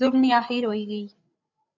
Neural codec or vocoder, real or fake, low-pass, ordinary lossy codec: codec, 16 kHz, 8 kbps, FreqCodec, larger model; fake; 7.2 kHz; MP3, 64 kbps